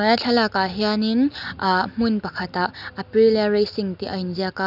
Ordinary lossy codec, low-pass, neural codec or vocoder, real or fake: none; 5.4 kHz; none; real